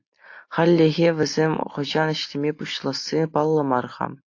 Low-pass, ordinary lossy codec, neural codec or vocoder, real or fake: 7.2 kHz; AAC, 48 kbps; none; real